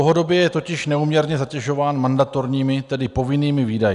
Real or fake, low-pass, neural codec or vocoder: real; 10.8 kHz; none